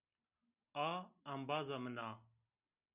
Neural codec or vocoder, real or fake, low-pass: none; real; 3.6 kHz